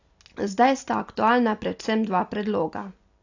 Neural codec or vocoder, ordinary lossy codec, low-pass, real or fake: none; AAC, 48 kbps; 7.2 kHz; real